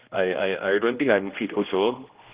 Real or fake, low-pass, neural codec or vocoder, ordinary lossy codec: fake; 3.6 kHz; codec, 16 kHz, 1 kbps, X-Codec, HuBERT features, trained on general audio; Opus, 32 kbps